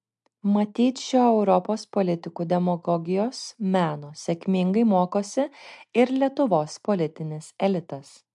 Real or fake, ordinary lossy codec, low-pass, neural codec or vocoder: real; MP3, 64 kbps; 10.8 kHz; none